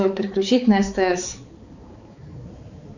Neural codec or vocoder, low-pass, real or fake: codec, 16 kHz, 4 kbps, X-Codec, HuBERT features, trained on balanced general audio; 7.2 kHz; fake